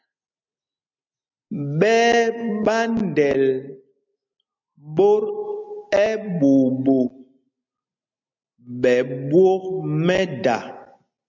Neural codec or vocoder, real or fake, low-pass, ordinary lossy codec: none; real; 7.2 kHz; MP3, 64 kbps